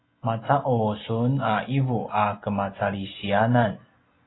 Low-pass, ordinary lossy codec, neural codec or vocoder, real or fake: 7.2 kHz; AAC, 16 kbps; none; real